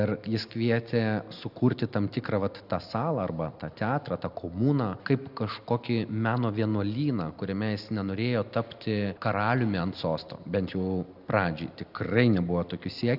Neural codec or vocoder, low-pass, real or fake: none; 5.4 kHz; real